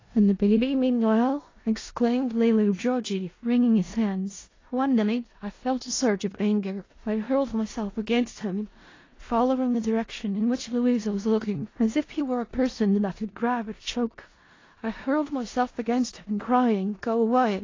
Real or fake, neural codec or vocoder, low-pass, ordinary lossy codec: fake; codec, 16 kHz in and 24 kHz out, 0.4 kbps, LongCat-Audio-Codec, four codebook decoder; 7.2 kHz; AAC, 32 kbps